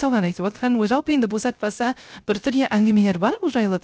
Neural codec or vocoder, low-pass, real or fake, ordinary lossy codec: codec, 16 kHz, 0.3 kbps, FocalCodec; none; fake; none